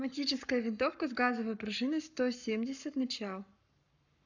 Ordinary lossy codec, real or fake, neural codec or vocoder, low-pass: none; fake; codec, 44.1 kHz, 7.8 kbps, Pupu-Codec; 7.2 kHz